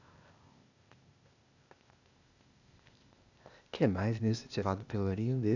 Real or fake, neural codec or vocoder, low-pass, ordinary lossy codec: fake; codec, 16 kHz, 0.8 kbps, ZipCodec; 7.2 kHz; none